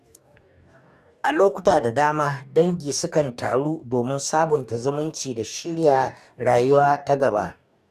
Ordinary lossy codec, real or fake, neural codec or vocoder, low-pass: none; fake; codec, 44.1 kHz, 2.6 kbps, DAC; 14.4 kHz